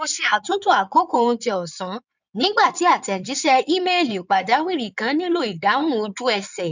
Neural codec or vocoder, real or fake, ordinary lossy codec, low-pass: codec, 16 kHz in and 24 kHz out, 2.2 kbps, FireRedTTS-2 codec; fake; none; 7.2 kHz